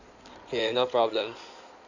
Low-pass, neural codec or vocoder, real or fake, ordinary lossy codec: 7.2 kHz; codec, 16 kHz in and 24 kHz out, 2.2 kbps, FireRedTTS-2 codec; fake; none